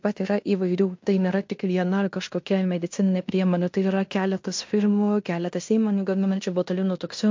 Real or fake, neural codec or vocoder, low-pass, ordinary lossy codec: fake; codec, 16 kHz in and 24 kHz out, 0.9 kbps, LongCat-Audio-Codec, fine tuned four codebook decoder; 7.2 kHz; MP3, 48 kbps